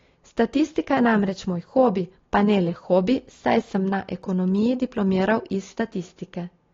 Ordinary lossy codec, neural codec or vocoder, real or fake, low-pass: AAC, 32 kbps; none; real; 7.2 kHz